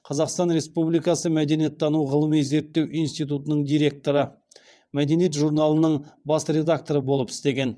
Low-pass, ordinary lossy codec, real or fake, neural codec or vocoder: none; none; fake; vocoder, 22.05 kHz, 80 mel bands, WaveNeXt